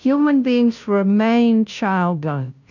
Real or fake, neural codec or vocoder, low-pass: fake; codec, 16 kHz, 0.5 kbps, FunCodec, trained on Chinese and English, 25 frames a second; 7.2 kHz